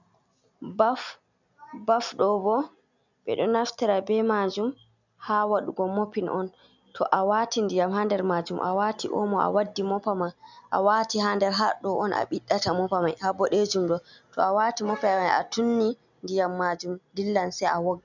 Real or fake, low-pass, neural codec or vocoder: real; 7.2 kHz; none